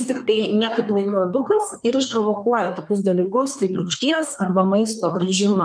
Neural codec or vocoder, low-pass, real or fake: codec, 24 kHz, 1 kbps, SNAC; 9.9 kHz; fake